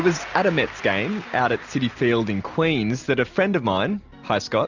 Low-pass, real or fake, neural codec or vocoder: 7.2 kHz; real; none